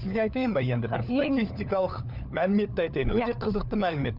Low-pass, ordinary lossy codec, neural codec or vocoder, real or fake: 5.4 kHz; none; codec, 16 kHz, 4 kbps, FunCodec, trained on LibriTTS, 50 frames a second; fake